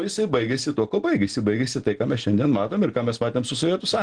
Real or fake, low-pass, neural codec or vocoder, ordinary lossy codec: real; 9.9 kHz; none; Opus, 16 kbps